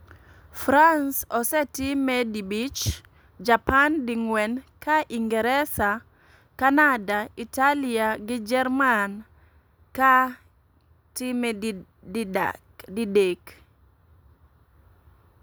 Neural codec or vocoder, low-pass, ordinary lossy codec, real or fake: none; none; none; real